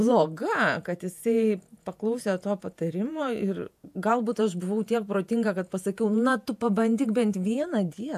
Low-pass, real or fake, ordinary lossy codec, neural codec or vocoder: 14.4 kHz; fake; AAC, 96 kbps; vocoder, 48 kHz, 128 mel bands, Vocos